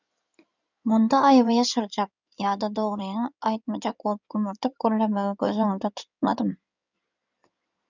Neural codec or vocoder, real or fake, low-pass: codec, 16 kHz in and 24 kHz out, 2.2 kbps, FireRedTTS-2 codec; fake; 7.2 kHz